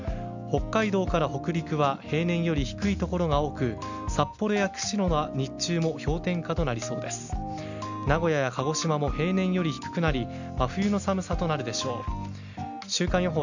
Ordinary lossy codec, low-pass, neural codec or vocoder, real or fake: none; 7.2 kHz; none; real